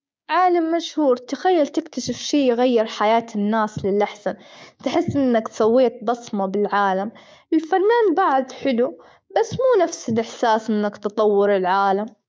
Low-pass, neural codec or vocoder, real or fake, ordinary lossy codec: none; codec, 16 kHz, 6 kbps, DAC; fake; none